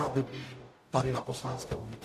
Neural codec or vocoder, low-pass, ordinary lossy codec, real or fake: codec, 44.1 kHz, 0.9 kbps, DAC; 14.4 kHz; AAC, 64 kbps; fake